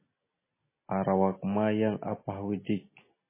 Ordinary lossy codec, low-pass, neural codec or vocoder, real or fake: MP3, 16 kbps; 3.6 kHz; none; real